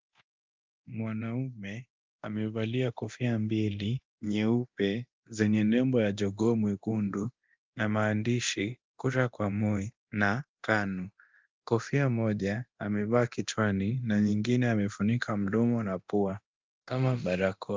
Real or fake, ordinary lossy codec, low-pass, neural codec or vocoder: fake; Opus, 24 kbps; 7.2 kHz; codec, 24 kHz, 0.9 kbps, DualCodec